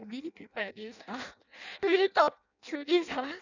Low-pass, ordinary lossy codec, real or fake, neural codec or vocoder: 7.2 kHz; none; fake; codec, 16 kHz in and 24 kHz out, 0.6 kbps, FireRedTTS-2 codec